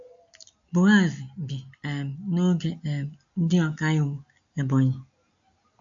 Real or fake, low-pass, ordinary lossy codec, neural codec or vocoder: real; 7.2 kHz; AAC, 64 kbps; none